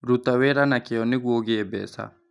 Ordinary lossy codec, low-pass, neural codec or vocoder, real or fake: none; 10.8 kHz; none; real